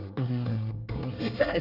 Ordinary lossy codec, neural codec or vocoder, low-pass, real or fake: none; codec, 24 kHz, 1 kbps, SNAC; 5.4 kHz; fake